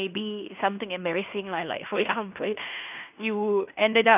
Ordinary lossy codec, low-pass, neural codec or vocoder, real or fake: none; 3.6 kHz; codec, 16 kHz in and 24 kHz out, 0.9 kbps, LongCat-Audio-Codec, fine tuned four codebook decoder; fake